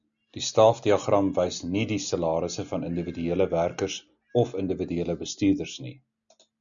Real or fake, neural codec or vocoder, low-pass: real; none; 7.2 kHz